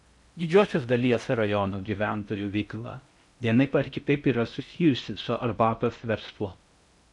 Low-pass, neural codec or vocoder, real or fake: 10.8 kHz; codec, 16 kHz in and 24 kHz out, 0.6 kbps, FocalCodec, streaming, 4096 codes; fake